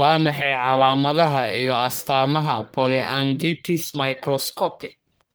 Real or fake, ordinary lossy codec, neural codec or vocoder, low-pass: fake; none; codec, 44.1 kHz, 1.7 kbps, Pupu-Codec; none